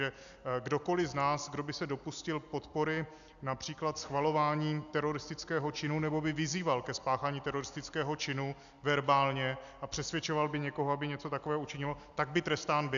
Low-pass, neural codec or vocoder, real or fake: 7.2 kHz; none; real